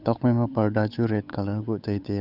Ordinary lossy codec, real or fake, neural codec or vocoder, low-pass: Opus, 64 kbps; fake; codec, 16 kHz, 8 kbps, FunCodec, trained on Chinese and English, 25 frames a second; 5.4 kHz